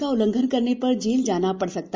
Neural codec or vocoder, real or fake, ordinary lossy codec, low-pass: none; real; none; none